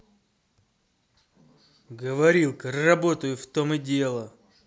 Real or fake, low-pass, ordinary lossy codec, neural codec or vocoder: real; none; none; none